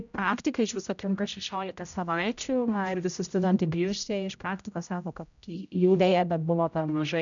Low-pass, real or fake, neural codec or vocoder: 7.2 kHz; fake; codec, 16 kHz, 0.5 kbps, X-Codec, HuBERT features, trained on general audio